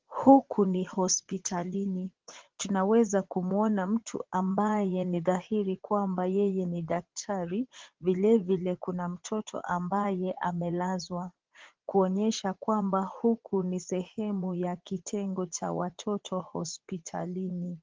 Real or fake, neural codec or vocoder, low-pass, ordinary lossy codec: fake; vocoder, 44.1 kHz, 80 mel bands, Vocos; 7.2 kHz; Opus, 16 kbps